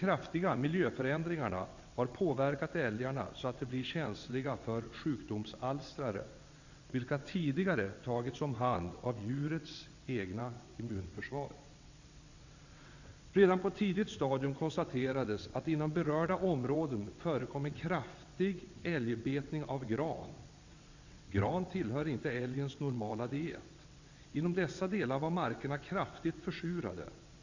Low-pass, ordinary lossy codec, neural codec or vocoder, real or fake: 7.2 kHz; Opus, 64 kbps; vocoder, 22.05 kHz, 80 mel bands, WaveNeXt; fake